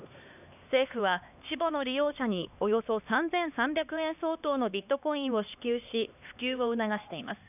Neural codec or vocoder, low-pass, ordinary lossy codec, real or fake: codec, 16 kHz, 2 kbps, X-Codec, HuBERT features, trained on LibriSpeech; 3.6 kHz; none; fake